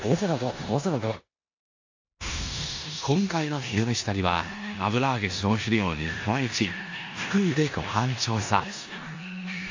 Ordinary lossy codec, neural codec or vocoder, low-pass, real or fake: AAC, 48 kbps; codec, 16 kHz in and 24 kHz out, 0.9 kbps, LongCat-Audio-Codec, four codebook decoder; 7.2 kHz; fake